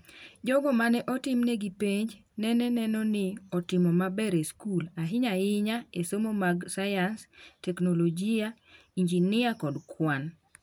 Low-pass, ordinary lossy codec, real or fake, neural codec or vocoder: none; none; real; none